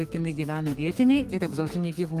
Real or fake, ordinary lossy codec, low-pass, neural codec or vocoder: fake; Opus, 32 kbps; 14.4 kHz; codec, 32 kHz, 1.9 kbps, SNAC